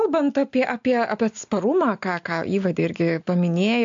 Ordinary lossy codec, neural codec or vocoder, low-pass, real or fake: AAC, 64 kbps; none; 7.2 kHz; real